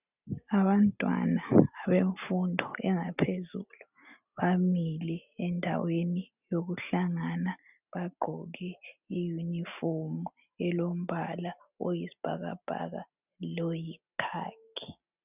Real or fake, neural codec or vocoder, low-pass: real; none; 3.6 kHz